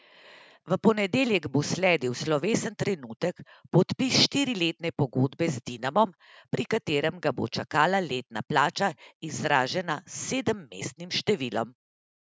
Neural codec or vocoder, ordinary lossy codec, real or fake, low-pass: none; none; real; none